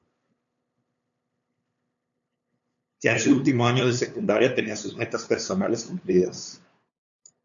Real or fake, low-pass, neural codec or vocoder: fake; 7.2 kHz; codec, 16 kHz, 2 kbps, FunCodec, trained on LibriTTS, 25 frames a second